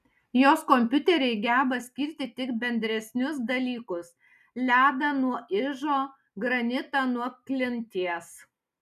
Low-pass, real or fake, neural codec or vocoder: 14.4 kHz; real; none